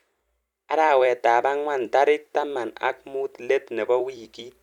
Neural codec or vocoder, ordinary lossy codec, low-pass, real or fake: vocoder, 44.1 kHz, 128 mel bands every 256 samples, BigVGAN v2; none; 19.8 kHz; fake